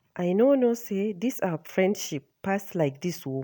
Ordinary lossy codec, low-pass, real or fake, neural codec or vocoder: none; none; real; none